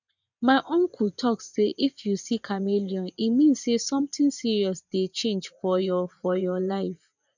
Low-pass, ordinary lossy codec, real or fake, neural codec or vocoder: 7.2 kHz; none; fake; vocoder, 24 kHz, 100 mel bands, Vocos